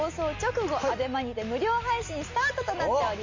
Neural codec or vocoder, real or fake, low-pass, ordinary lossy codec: none; real; 7.2 kHz; MP3, 32 kbps